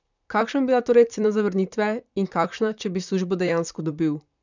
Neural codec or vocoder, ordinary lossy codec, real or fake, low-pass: vocoder, 44.1 kHz, 128 mel bands, Pupu-Vocoder; none; fake; 7.2 kHz